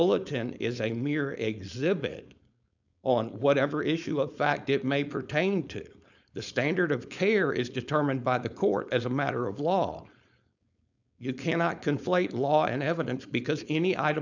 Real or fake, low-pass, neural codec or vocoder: fake; 7.2 kHz; codec, 16 kHz, 4.8 kbps, FACodec